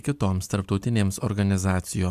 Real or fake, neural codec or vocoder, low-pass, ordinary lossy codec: real; none; 14.4 kHz; MP3, 96 kbps